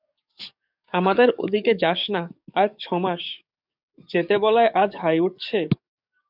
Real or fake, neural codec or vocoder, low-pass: fake; codec, 44.1 kHz, 7.8 kbps, DAC; 5.4 kHz